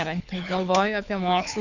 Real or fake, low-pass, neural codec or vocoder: fake; 7.2 kHz; codec, 24 kHz, 6 kbps, HILCodec